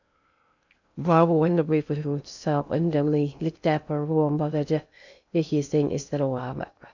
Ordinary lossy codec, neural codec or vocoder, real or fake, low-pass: none; codec, 16 kHz in and 24 kHz out, 0.6 kbps, FocalCodec, streaming, 2048 codes; fake; 7.2 kHz